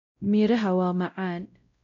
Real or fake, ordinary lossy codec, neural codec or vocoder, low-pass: fake; MP3, 48 kbps; codec, 16 kHz, 0.5 kbps, X-Codec, WavLM features, trained on Multilingual LibriSpeech; 7.2 kHz